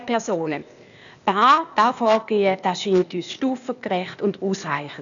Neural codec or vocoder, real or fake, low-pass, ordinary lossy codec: codec, 16 kHz, 0.8 kbps, ZipCodec; fake; 7.2 kHz; none